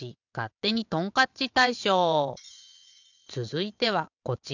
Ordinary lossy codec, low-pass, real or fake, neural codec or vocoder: none; 7.2 kHz; fake; vocoder, 22.05 kHz, 80 mel bands, WaveNeXt